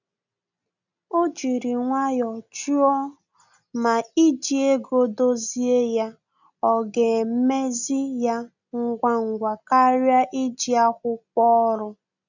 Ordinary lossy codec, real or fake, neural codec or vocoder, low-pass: none; real; none; 7.2 kHz